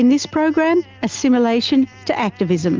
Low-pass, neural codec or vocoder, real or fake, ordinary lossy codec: 7.2 kHz; none; real; Opus, 32 kbps